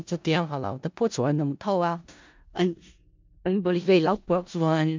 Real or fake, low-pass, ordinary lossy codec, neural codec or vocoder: fake; 7.2 kHz; MP3, 64 kbps; codec, 16 kHz in and 24 kHz out, 0.4 kbps, LongCat-Audio-Codec, four codebook decoder